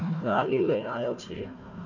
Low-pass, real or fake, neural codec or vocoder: 7.2 kHz; fake; codec, 16 kHz, 1 kbps, FunCodec, trained on Chinese and English, 50 frames a second